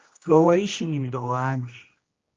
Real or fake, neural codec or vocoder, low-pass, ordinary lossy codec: fake; codec, 16 kHz, 1 kbps, X-Codec, HuBERT features, trained on general audio; 7.2 kHz; Opus, 32 kbps